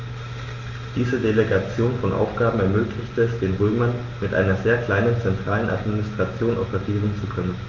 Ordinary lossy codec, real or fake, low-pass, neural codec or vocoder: Opus, 32 kbps; real; 7.2 kHz; none